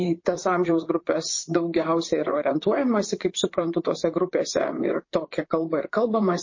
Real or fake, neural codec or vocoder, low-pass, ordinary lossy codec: fake; vocoder, 44.1 kHz, 128 mel bands, Pupu-Vocoder; 7.2 kHz; MP3, 32 kbps